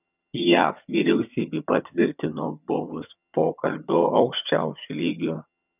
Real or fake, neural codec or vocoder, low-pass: fake; vocoder, 22.05 kHz, 80 mel bands, HiFi-GAN; 3.6 kHz